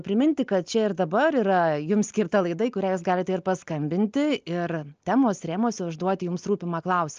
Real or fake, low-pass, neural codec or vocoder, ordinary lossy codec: real; 7.2 kHz; none; Opus, 24 kbps